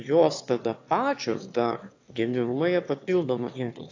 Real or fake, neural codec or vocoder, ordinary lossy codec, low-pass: fake; autoencoder, 22.05 kHz, a latent of 192 numbers a frame, VITS, trained on one speaker; AAC, 48 kbps; 7.2 kHz